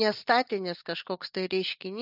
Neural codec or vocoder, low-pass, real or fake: none; 5.4 kHz; real